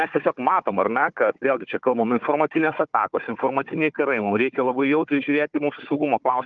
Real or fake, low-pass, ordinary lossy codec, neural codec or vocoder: fake; 7.2 kHz; Opus, 32 kbps; codec, 16 kHz, 4 kbps, FunCodec, trained on Chinese and English, 50 frames a second